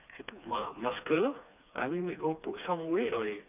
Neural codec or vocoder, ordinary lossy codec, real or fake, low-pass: codec, 16 kHz, 2 kbps, FreqCodec, smaller model; none; fake; 3.6 kHz